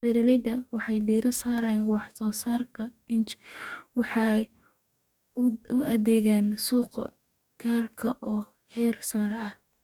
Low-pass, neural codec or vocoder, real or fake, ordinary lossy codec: 19.8 kHz; codec, 44.1 kHz, 2.6 kbps, DAC; fake; none